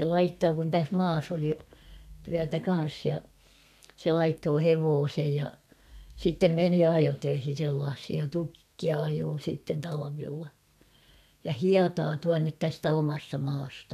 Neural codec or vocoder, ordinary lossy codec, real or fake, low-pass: codec, 32 kHz, 1.9 kbps, SNAC; none; fake; 14.4 kHz